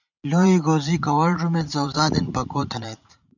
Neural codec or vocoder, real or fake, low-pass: vocoder, 44.1 kHz, 80 mel bands, Vocos; fake; 7.2 kHz